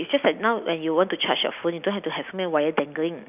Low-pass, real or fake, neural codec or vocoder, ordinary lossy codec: 3.6 kHz; real; none; none